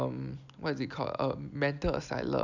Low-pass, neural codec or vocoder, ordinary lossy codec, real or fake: 7.2 kHz; none; none; real